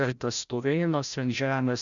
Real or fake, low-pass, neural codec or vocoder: fake; 7.2 kHz; codec, 16 kHz, 0.5 kbps, FreqCodec, larger model